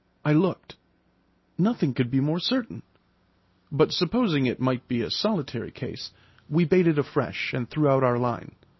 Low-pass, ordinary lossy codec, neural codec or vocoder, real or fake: 7.2 kHz; MP3, 24 kbps; none; real